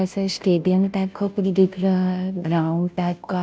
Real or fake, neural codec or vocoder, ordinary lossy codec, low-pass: fake; codec, 16 kHz, 0.5 kbps, FunCodec, trained on Chinese and English, 25 frames a second; none; none